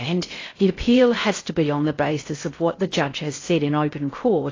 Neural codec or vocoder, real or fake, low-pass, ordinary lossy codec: codec, 16 kHz in and 24 kHz out, 0.6 kbps, FocalCodec, streaming, 4096 codes; fake; 7.2 kHz; AAC, 32 kbps